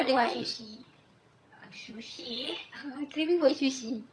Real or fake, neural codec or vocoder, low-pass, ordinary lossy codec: fake; vocoder, 22.05 kHz, 80 mel bands, HiFi-GAN; none; none